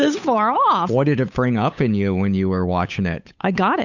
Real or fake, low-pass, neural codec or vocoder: fake; 7.2 kHz; codec, 16 kHz, 8 kbps, FunCodec, trained on Chinese and English, 25 frames a second